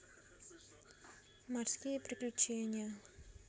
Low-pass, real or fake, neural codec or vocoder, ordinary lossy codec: none; real; none; none